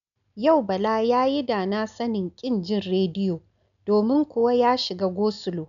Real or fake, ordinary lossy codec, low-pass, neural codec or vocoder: real; none; 7.2 kHz; none